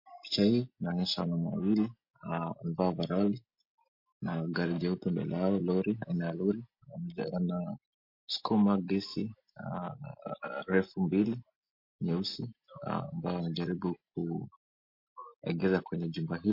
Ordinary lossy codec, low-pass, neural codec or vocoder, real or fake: MP3, 32 kbps; 5.4 kHz; none; real